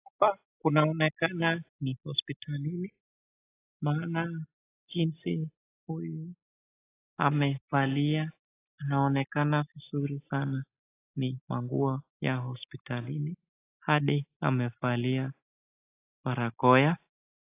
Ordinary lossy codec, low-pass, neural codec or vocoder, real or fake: AAC, 24 kbps; 3.6 kHz; none; real